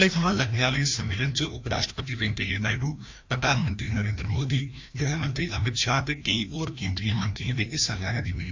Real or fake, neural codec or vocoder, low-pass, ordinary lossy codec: fake; codec, 16 kHz, 1 kbps, FreqCodec, larger model; 7.2 kHz; AAC, 48 kbps